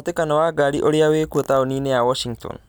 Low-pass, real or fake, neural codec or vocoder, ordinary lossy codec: none; real; none; none